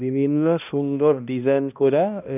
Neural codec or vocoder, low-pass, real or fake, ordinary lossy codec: codec, 16 kHz, 1 kbps, X-Codec, HuBERT features, trained on balanced general audio; 3.6 kHz; fake; none